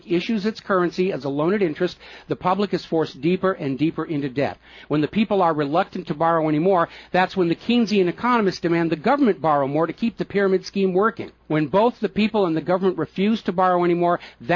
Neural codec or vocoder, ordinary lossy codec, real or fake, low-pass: none; MP3, 48 kbps; real; 7.2 kHz